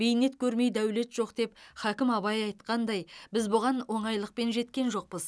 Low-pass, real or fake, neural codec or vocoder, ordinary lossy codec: none; real; none; none